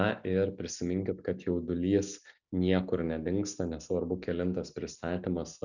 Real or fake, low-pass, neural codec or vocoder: real; 7.2 kHz; none